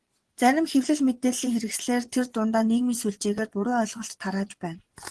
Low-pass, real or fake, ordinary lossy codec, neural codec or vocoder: 10.8 kHz; fake; Opus, 16 kbps; vocoder, 24 kHz, 100 mel bands, Vocos